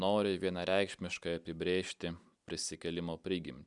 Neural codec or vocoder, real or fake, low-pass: none; real; 10.8 kHz